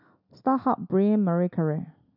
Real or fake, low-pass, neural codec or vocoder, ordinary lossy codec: real; 5.4 kHz; none; none